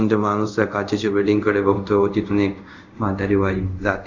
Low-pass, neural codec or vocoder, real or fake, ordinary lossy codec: 7.2 kHz; codec, 24 kHz, 0.5 kbps, DualCodec; fake; Opus, 64 kbps